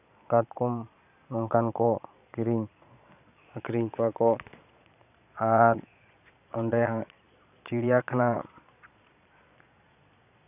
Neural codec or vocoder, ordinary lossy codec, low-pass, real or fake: vocoder, 22.05 kHz, 80 mel bands, Vocos; AAC, 32 kbps; 3.6 kHz; fake